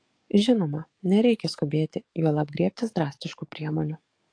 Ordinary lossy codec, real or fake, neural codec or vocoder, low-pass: AAC, 48 kbps; fake; codec, 44.1 kHz, 7.8 kbps, DAC; 9.9 kHz